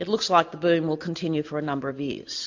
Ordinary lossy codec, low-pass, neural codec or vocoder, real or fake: AAC, 48 kbps; 7.2 kHz; none; real